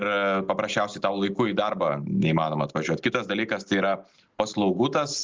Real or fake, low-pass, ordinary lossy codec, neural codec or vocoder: real; 7.2 kHz; Opus, 32 kbps; none